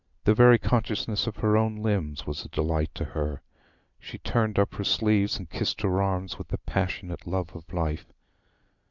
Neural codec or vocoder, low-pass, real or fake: none; 7.2 kHz; real